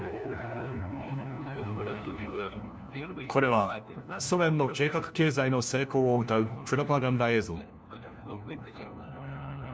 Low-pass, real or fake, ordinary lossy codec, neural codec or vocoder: none; fake; none; codec, 16 kHz, 1 kbps, FunCodec, trained on LibriTTS, 50 frames a second